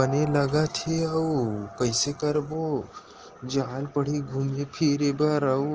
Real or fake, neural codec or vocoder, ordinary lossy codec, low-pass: real; none; Opus, 16 kbps; 7.2 kHz